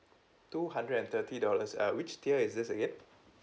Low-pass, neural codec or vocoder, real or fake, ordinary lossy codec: none; none; real; none